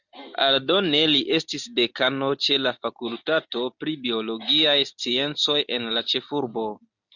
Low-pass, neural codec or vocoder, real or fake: 7.2 kHz; none; real